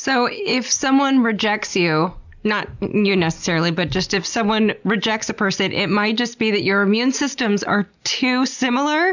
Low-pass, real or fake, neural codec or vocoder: 7.2 kHz; real; none